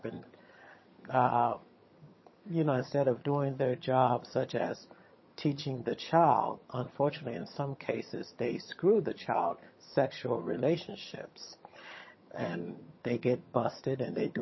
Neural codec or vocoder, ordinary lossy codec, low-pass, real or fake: vocoder, 22.05 kHz, 80 mel bands, HiFi-GAN; MP3, 24 kbps; 7.2 kHz; fake